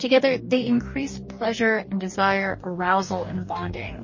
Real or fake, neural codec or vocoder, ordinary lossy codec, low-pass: fake; codec, 44.1 kHz, 2.6 kbps, DAC; MP3, 32 kbps; 7.2 kHz